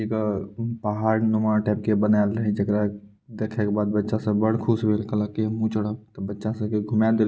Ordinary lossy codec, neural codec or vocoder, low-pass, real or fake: none; none; none; real